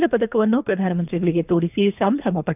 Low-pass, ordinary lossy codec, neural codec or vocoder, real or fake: 3.6 kHz; none; codec, 24 kHz, 3 kbps, HILCodec; fake